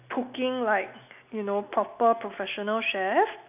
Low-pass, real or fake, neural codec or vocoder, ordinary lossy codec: 3.6 kHz; real; none; none